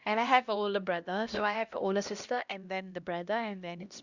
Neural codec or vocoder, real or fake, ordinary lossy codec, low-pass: codec, 16 kHz, 1 kbps, X-Codec, HuBERT features, trained on LibriSpeech; fake; Opus, 64 kbps; 7.2 kHz